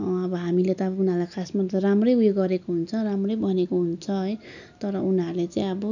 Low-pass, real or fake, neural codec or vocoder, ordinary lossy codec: 7.2 kHz; real; none; none